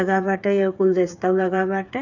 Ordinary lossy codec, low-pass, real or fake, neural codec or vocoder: none; 7.2 kHz; fake; codec, 16 kHz, 8 kbps, FreqCodec, smaller model